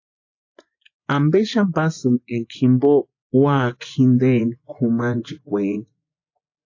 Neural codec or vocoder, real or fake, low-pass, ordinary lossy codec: vocoder, 24 kHz, 100 mel bands, Vocos; fake; 7.2 kHz; AAC, 48 kbps